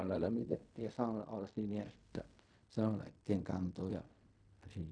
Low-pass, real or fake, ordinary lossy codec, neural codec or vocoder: 9.9 kHz; fake; none; codec, 16 kHz in and 24 kHz out, 0.4 kbps, LongCat-Audio-Codec, fine tuned four codebook decoder